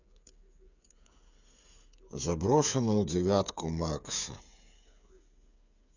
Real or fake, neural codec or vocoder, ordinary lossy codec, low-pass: fake; codec, 16 kHz, 8 kbps, FreqCodec, smaller model; none; 7.2 kHz